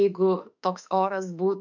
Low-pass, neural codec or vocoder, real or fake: 7.2 kHz; autoencoder, 48 kHz, 32 numbers a frame, DAC-VAE, trained on Japanese speech; fake